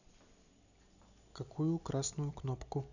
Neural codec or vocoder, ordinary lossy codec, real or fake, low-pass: vocoder, 44.1 kHz, 128 mel bands every 256 samples, BigVGAN v2; none; fake; 7.2 kHz